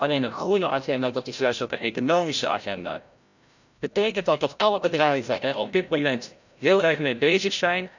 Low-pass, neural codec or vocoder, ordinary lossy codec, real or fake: 7.2 kHz; codec, 16 kHz, 0.5 kbps, FreqCodec, larger model; none; fake